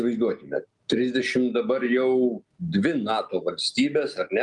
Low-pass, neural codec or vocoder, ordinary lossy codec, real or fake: 10.8 kHz; none; Opus, 32 kbps; real